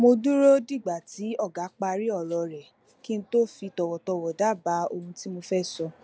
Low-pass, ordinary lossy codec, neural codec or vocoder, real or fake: none; none; none; real